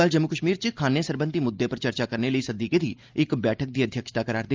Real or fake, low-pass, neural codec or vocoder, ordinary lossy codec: real; 7.2 kHz; none; Opus, 24 kbps